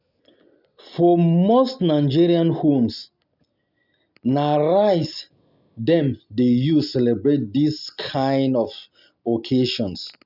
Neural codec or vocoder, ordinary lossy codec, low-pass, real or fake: none; none; 5.4 kHz; real